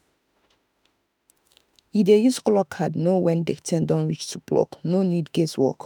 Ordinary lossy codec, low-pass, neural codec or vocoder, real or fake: none; none; autoencoder, 48 kHz, 32 numbers a frame, DAC-VAE, trained on Japanese speech; fake